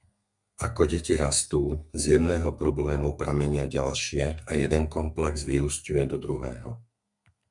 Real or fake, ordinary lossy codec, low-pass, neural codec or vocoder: fake; MP3, 96 kbps; 10.8 kHz; codec, 32 kHz, 1.9 kbps, SNAC